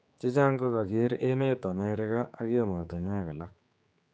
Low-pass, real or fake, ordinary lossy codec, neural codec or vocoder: none; fake; none; codec, 16 kHz, 4 kbps, X-Codec, HuBERT features, trained on general audio